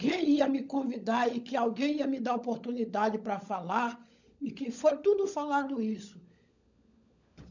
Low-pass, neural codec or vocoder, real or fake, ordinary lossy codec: 7.2 kHz; codec, 16 kHz, 16 kbps, FunCodec, trained on LibriTTS, 50 frames a second; fake; none